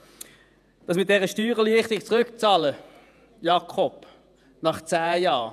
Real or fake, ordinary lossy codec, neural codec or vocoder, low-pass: fake; none; vocoder, 48 kHz, 128 mel bands, Vocos; 14.4 kHz